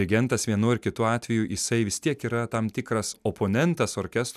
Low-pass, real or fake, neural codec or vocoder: 14.4 kHz; real; none